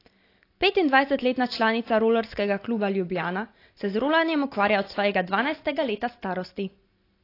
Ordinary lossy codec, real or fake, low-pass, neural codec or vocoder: AAC, 32 kbps; real; 5.4 kHz; none